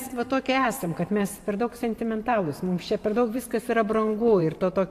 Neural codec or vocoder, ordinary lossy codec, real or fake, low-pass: vocoder, 44.1 kHz, 128 mel bands every 512 samples, BigVGAN v2; AAC, 64 kbps; fake; 14.4 kHz